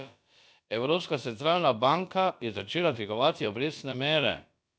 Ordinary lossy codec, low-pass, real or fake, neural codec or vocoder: none; none; fake; codec, 16 kHz, about 1 kbps, DyCAST, with the encoder's durations